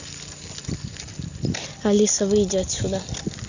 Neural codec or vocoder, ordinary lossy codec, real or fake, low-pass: none; Opus, 64 kbps; real; 7.2 kHz